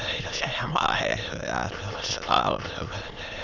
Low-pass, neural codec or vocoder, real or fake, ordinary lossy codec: 7.2 kHz; autoencoder, 22.05 kHz, a latent of 192 numbers a frame, VITS, trained on many speakers; fake; none